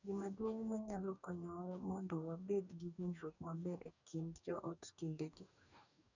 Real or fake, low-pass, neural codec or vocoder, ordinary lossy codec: fake; 7.2 kHz; codec, 44.1 kHz, 2.6 kbps, DAC; none